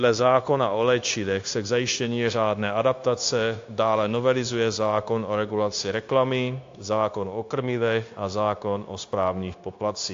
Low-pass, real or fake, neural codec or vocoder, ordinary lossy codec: 7.2 kHz; fake; codec, 16 kHz, 0.9 kbps, LongCat-Audio-Codec; AAC, 48 kbps